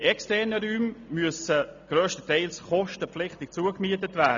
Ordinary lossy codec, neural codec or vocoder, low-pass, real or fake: MP3, 64 kbps; none; 7.2 kHz; real